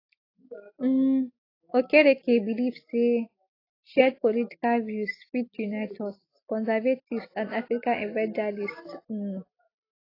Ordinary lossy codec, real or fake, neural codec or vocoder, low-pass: AAC, 24 kbps; real; none; 5.4 kHz